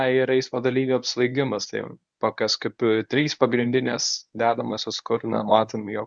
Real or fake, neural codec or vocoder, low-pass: fake; codec, 24 kHz, 0.9 kbps, WavTokenizer, medium speech release version 1; 9.9 kHz